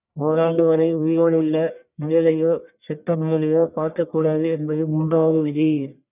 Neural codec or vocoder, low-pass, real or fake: codec, 44.1 kHz, 1.7 kbps, Pupu-Codec; 3.6 kHz; fake